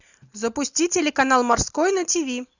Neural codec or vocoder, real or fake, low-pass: none; real; 7.2 kHz